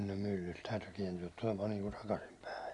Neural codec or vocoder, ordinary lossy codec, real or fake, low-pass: none; none; real; 10.8 kHz